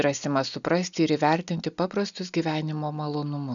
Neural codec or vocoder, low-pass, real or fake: none; 7.2 kHz; real